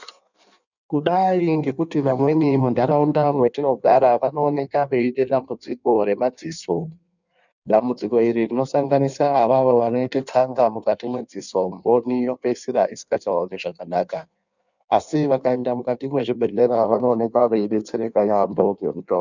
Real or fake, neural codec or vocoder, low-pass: fake; codec, 16 kHz in and 24 kHz out, 1.1 kbps, FireRedTTS-2 codec; 7.2 kHz